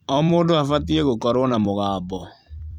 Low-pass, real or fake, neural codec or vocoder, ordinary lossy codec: 19.8 kHz; fake; vocoder, 44.1 kHz, 128 mel bands every 256 samples, BigVGAN v2; none